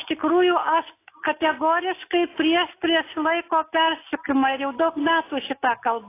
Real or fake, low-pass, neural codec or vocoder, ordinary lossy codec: real; 3.6 kHz; none; AAC, 24 kbps